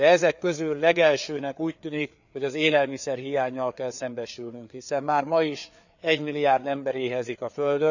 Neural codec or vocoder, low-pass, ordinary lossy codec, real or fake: codec, 16 kHz, 4 kbps, FreqCodec, larger model; 7.2 kHz; none; fake